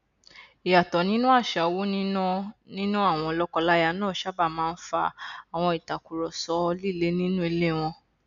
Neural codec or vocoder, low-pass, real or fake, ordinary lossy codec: none; 7.2 kHz; real; none